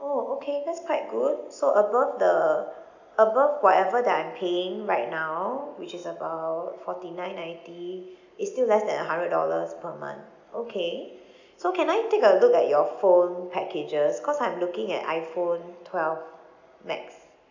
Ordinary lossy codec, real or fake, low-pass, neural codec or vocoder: none; real; 7.2 kHz; none